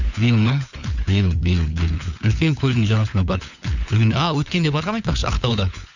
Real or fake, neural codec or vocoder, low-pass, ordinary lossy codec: fake; codec, 16 kHz, 4 kbps, FunCodec, trained on LibriTTS, 50 frames a second; 7.2 kHz; none